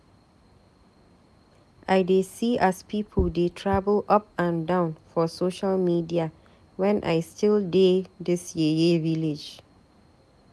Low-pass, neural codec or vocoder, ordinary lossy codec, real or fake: none; none; none; real